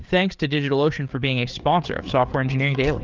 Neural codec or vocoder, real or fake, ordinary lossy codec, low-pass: codec, 16 kHz, 4 kbps, FreqCodec, larger model; fake; Opus, 32 kbps; 7.2 kHz